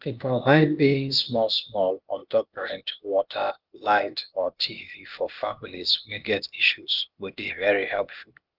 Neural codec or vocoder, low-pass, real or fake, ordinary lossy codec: codec, 16 kHz, 0.8 kbps, ZipCodec; 5.4 kHz; fake; Opus, 32 kbps